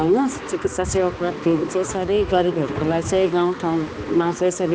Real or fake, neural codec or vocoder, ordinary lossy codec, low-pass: fake; codec, 16 kHz, 2 kbps, X-Codec, HuBERT features, trained on balanced general audio; none; none